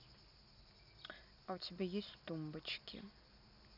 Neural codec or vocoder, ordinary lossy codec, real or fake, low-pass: none; none; real; 5.4 kHz